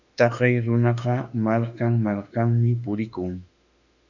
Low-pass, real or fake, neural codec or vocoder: 7.2 kHz; fake; autoencoder, 48 kHz, 32 numbers a frame, DAC-VAE, trained on Japanese speech